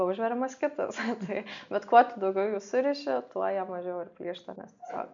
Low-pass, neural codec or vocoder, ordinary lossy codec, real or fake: 7.2 kHz; none; MP3, 64 kbps; real